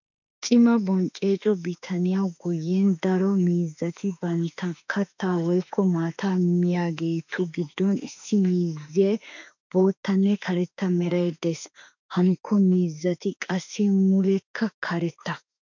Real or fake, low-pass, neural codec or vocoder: fake; 7.2 kHz; autoencoder, 48 kHz, 32 numbers a frame, DAC-VAE, trained on Japanese speech